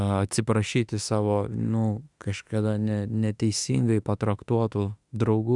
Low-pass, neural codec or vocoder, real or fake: 10.8 kHz; autoencoder, 48 kHz, 32 numbers a frame, DAC-VAE, trained on Japanese speech; fake